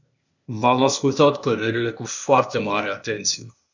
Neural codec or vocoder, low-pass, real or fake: codec, 16 kHz, 0.8 kbps, ZipCodec; 7.2 kHz; fake